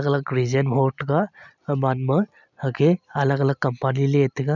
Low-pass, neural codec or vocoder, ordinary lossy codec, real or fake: 7.2 kHz; none; none; real